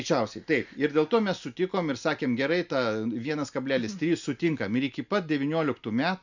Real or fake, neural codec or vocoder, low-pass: real; none; 7.2 kHz